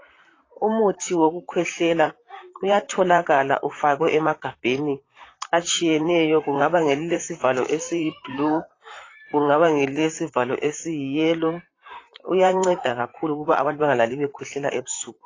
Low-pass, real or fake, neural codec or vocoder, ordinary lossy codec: 7.2 kHz; fake; vocoder, 44.1 kHz, 128 mel bands, Pupu-Vocoder; AAC, 32 kbps